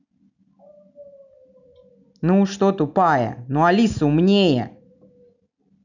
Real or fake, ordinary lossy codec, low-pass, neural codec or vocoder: real; none; 7.2 kHz; none